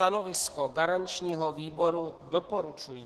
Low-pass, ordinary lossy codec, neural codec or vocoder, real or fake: 14.4 kHz; Opus, 16 kbps; codec, 32 kHz, 1.9 kbps, SNAC; fake